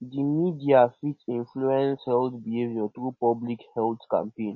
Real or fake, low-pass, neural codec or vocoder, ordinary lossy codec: real; 7.2 kHz; none; MP3, 32 kbps